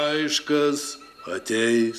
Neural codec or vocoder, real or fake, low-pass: none; real; 14.4 kHz